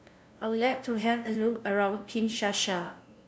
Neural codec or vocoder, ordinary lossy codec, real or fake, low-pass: codec, 16 kHz, 0.5 kbps, FunCodec, trained on LibriTTS, 25 frames a second; none; fake; none